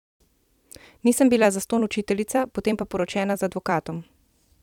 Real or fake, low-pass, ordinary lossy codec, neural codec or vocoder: fake; 19.8 kHz; none; vocoder, 44.1 kHz, 128 mel bands every 256 samples, BigVGAN v2